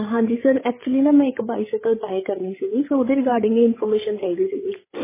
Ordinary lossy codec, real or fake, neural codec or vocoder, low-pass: MP3, 16 kbps; fake; codec, 16 kHz, 8 kbps, FreqCodec, larger model; 3.6 kHz